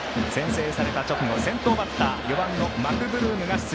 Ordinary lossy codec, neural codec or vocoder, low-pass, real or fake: none; none; none; real